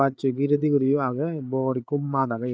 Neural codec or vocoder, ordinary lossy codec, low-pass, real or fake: codec, 16 kHz, 8 kbps, FreqCodec, larger model; none; none; fake